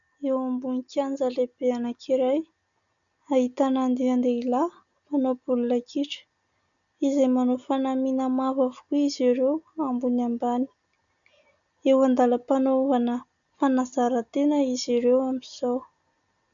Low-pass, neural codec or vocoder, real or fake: 7.2 kHz; none; real